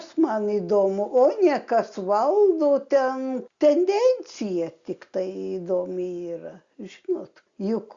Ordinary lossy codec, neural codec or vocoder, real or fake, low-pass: Opus, 64 kbps; none; real; 7.2 kHz